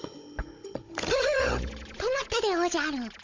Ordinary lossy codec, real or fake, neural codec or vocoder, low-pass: MP3, 48 kbps; fake; codec, 16 kHz, 16 kbps, FunCodec, trained on Chinese and English, 50 frames a second; 7.2 kHz